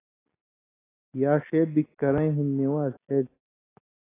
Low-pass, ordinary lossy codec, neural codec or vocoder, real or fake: 3.6 kHz; AAC, 16 kbps; none; real